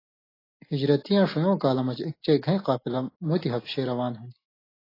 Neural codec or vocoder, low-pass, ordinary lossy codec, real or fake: none; 5.4 kHz; AAC, 24 kbps; real